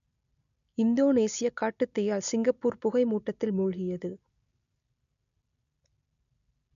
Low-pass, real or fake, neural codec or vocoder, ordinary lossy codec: 7.2 kHz; real; none; none